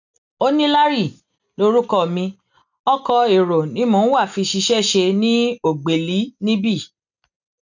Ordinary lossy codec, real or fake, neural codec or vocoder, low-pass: none; real; none; 7.2 kHz